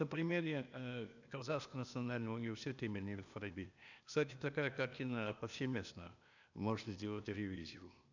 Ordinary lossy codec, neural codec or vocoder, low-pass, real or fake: none; codec, 16 kHz, 0.8 kbps, ZipCodec; 7.2 kHz; fake